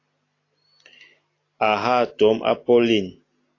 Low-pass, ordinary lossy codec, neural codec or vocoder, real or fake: 7.2 kHz; AAC, 32 kbps; none; real